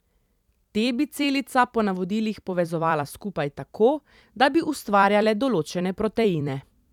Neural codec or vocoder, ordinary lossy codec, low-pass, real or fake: vocoder, 44.1 kHz, 128 mel bands every 256 samples, BigVGAN v2; none; 19.8 kHz; fake